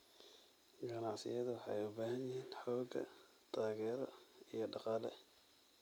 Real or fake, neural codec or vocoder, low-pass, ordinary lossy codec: real; none; none; none